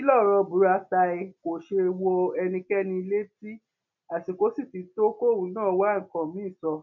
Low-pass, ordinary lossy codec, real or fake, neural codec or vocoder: 7.2 kHz; none; real; none